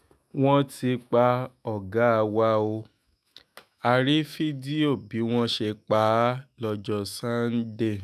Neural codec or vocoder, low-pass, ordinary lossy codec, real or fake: autoencoder, 48 kHz, 128 numbers a frame, DAC-VAE, trained on Japanese speech; 14.4 kHz; none; fake